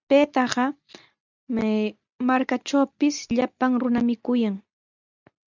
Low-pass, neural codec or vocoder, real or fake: 7.2 kHz; none; real